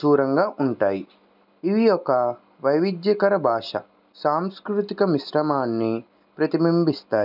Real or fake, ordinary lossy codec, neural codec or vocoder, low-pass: real; none; none; 5.4 kHz